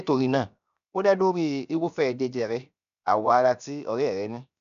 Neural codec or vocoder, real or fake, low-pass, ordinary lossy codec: codec, 16 kHz, 0.7 kbps, FocalCodec; fake; 7.2 kHz; none